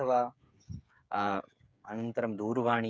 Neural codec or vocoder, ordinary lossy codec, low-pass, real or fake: codec, 16 kHz, 8 kbps, FreqCodec, smaller model; none; none; fake